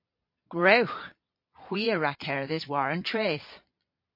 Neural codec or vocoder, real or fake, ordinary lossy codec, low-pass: vocoder, 22.05 kHz, 80 mel bands, Vocos; fake; MP3, 32 kbps; 5.4 kHz